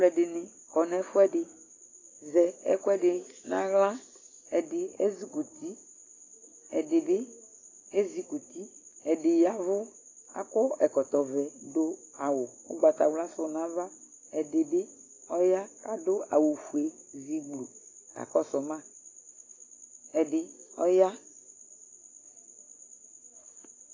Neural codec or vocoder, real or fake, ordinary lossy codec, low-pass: none; real; AAC, 32 kbps; 7.2 kHz